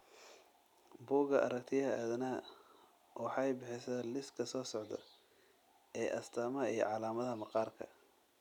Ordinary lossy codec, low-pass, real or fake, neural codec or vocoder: none; 19.8 kHz; real; none